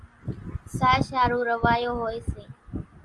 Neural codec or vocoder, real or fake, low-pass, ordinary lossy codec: none; real; 10.8 kHz; Opus, 32 kbps